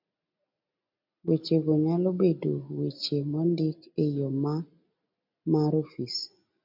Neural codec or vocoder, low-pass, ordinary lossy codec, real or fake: none; 5.4 kHz; MP3, 48 kbps; real